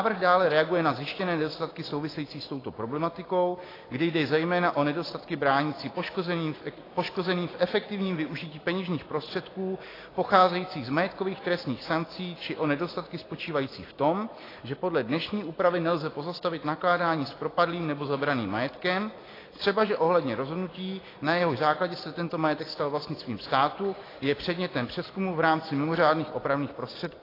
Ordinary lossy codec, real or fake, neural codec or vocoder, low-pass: AAC, 24 kbps; real; none; 5.4 kHz